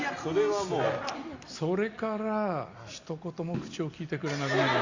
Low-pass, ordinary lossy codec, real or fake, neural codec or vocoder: 7.2 kHz; Opus, 64 kbps; real; none